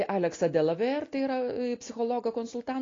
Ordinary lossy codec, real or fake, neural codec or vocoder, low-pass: AAC, 32 kbps; real; none; 7.2 kHz